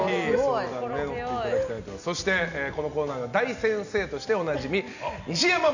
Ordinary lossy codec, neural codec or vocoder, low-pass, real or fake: none; none; 7.2 kHz; real